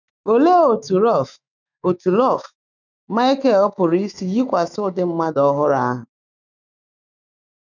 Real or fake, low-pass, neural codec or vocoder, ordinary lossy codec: fake; 7.2 kHz; autoencoder, 48 kHz, 128 numbers a frame, DAC-VAE, trained on Japanese speech; none